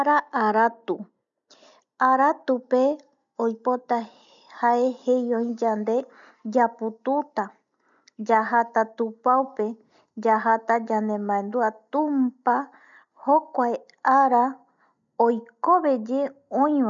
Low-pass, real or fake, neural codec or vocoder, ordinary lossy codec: 7.2 kHz; real; none; none